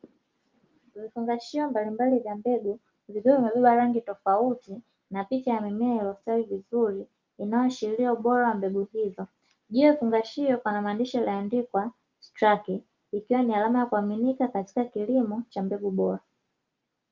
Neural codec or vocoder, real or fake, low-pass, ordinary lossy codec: none; real; 7.2 kHz; Opus, 24 kbps